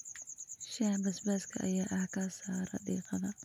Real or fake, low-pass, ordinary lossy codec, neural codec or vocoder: real; 19.8 kHz; none; none